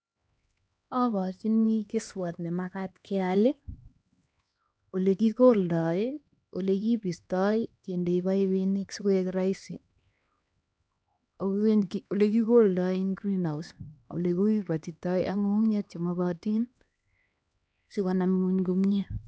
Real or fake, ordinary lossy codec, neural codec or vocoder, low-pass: fake; none; codec, 16 kHz, 2 kbps, X-Codec, HuBERT features, trained on LibriSpeech; none